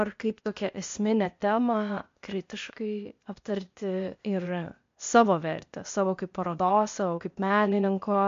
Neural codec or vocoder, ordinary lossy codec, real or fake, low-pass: codec, 16 kHz, 0.8 kbps, ZipCodec; MP3, 48 kbps; fake; 7.2 kHz